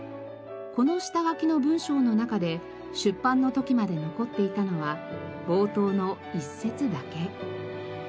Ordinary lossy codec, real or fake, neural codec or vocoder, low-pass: none; real; none; none